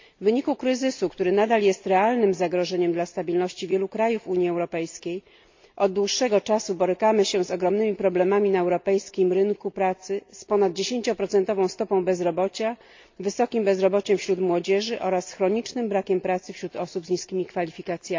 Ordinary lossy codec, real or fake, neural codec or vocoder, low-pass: MP3, 48 kbps; real; none; 7.2 kHz